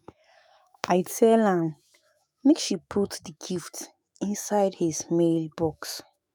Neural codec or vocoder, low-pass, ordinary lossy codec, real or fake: autoencoder, 48 kHz, 128 numbers a frame, DAC-VAE, trained on Japanese speech; none; none; fake